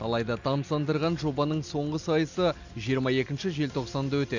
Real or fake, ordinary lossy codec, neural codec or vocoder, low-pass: real; none; none; 7.2 kHz